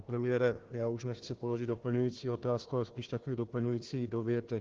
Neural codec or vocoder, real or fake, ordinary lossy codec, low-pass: codec, 16 kHz, 1 kbps, FunCodec, trained on Chinese and English, 50 frames a second; fake; Opus, 16 kbps; 7.2 kHz